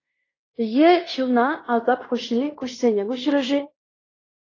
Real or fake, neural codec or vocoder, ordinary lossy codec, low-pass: fake; codec, 16 kHz in and 24 kHz out, 0.9 kbps, LongCat-Audio-Codec, fine tuned four codebook decoder; AAC, 32 kbps; 7.2 kHz